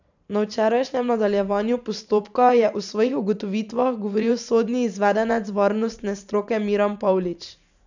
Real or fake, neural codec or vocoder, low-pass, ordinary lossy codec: fake; vocoder, 44.1 kHz, 128 mel bands every 512 samples, BigVGAN v2; 7.2 kHz; none